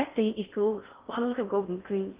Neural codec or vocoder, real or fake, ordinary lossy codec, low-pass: codec, 16 kHz in and 24 kHz out, 0.6 kbps, FocalCodec, streaming, 4096 codes; fake; Opus, 32 kbps; 3.6 kHz